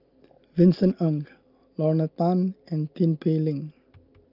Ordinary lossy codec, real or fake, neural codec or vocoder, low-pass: Opus, 24 kbps; real; none; 5.4 kHz